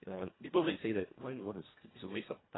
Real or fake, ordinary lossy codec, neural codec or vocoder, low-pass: fake; AAC, 16 kbps; codec, 24 kHz, 1.5 kbps, HILCodec; 7.2 kHz